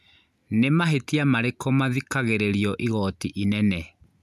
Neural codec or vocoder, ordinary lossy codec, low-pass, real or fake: none; none; 14.4 kHz; real